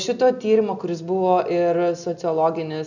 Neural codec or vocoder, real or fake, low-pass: none; real; 7.2 kHz